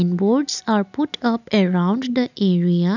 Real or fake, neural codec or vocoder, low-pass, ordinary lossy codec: real; none; 7.2 kHz; none